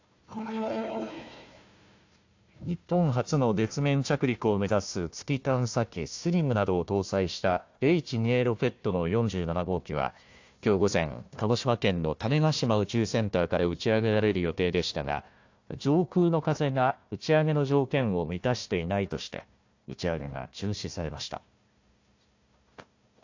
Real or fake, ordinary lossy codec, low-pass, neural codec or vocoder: fake; AAC, 48 kbps; 7.2 kHz; codec, 16 kHz, 1 kbps, FunCodec, trained on Chinese and English, 50 frames a second